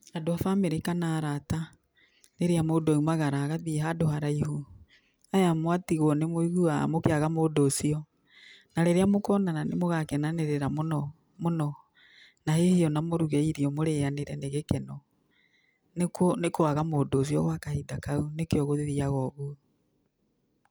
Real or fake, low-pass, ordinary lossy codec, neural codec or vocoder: real; none; none; none